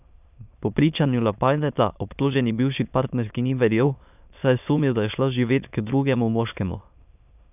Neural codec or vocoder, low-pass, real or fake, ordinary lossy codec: autoencoder, 22.05 kHz, a latent of 192 numbers a frame, VITS, trained on many speakers; 3.6 kHz; fake; none